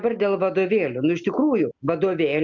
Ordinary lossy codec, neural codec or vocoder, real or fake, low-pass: MP3, 64 kbps; none; real; 7.2 kHz